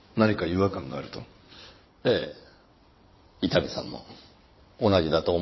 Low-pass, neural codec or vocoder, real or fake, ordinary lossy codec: 7.2 kHz; vocoder, 22.05 kHz, 80 mel bands, WaveNeXt; fake; MP3, 24 kbps